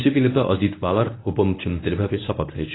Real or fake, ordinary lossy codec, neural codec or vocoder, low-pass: fake; AAC, 16 kbps; codec, 24 kHz, 0.9 kbps, WavTokenizer, medium speech release version 1; 7.2 kHz